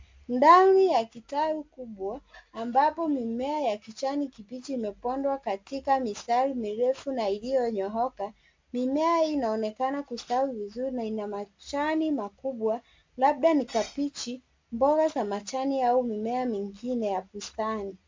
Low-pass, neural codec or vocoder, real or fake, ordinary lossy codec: 7.2 kHz; none; real; MP3, 64 kbps